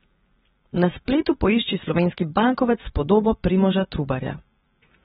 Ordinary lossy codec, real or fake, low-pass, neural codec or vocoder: AAC, 16 kbps; real; 19.8 kHz; none